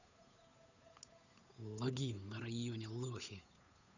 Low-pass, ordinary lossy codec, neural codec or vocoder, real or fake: 7.2 kHz; none; codec, 16 kHz, 8 kbps, FunCodec, trained on Chinese and English, 25 frames a second; fake